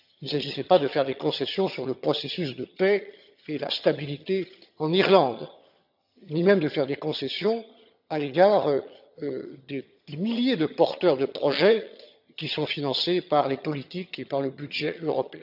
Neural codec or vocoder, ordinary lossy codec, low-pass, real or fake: vocoder, 22.05 kHz, 80 mel bands, HiFi-GAN; none; 5.4 kHz; fake